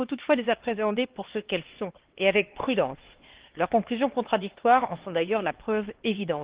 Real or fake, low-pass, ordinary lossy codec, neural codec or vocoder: fake; 3.6 kHz; Opus, 16 kbps; codec, 16 kHz, 2 kbps, X-Codec, HuBERT features, trained on LibriSpeech